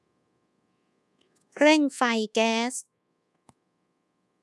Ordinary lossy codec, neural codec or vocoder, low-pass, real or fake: none; codec, 24 kHz, 1.2 kbps, DualCodec; none; fake